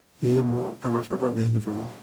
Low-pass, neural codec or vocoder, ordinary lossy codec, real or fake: none; codec, 44.1 kHz, 0.9 kbps, DAC; none; fake